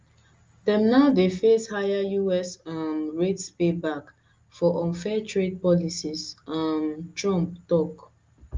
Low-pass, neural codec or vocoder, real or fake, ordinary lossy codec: 7.2 kHz; none; real; Opus, 32 kbps